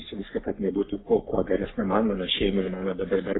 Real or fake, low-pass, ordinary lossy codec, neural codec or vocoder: fake; 7.2 kHz; AAC, 16 kbps; codec, 44.1 kHz, 3.4 kbps, Pupu-Codec